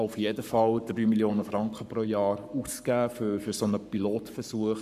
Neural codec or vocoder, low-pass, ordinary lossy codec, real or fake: codec, 44.1 kHz, 7.8 kbps, Pupu-Codec; 14.4 kHz; none; fake